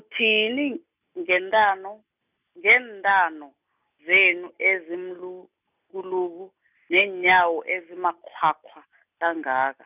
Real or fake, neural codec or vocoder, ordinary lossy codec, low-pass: real; none; none; 3.6 kHz